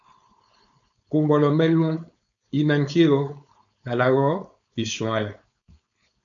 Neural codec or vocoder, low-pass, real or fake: codec, 16 kHz, 4.8 kbps, FACodec; 7.2 kHz; fake